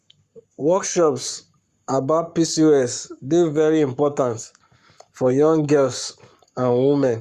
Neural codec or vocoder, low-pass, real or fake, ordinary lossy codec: codec, 44.1 kHz, 7.8 kbps, Pupu-Codec; 14.4 kHz; fake; Opus, 64 kbps